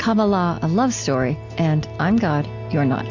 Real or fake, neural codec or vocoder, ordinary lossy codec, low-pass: real; none; AAC, 48 kbps; 7.2 kHz